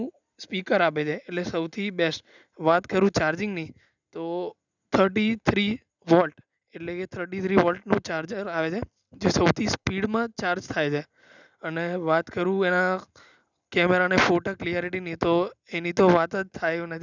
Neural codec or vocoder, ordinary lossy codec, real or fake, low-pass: none; none; real; 7.2 kHz